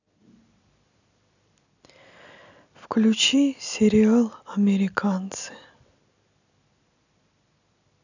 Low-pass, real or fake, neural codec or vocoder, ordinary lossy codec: 7.2 kHz; real; none; none